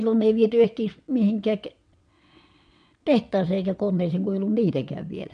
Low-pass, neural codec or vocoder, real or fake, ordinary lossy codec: 9.9 kHz; vocoder, 22.05 kHz, 80 mel bands, WaveNeXt; fake; MP3, 64 kbps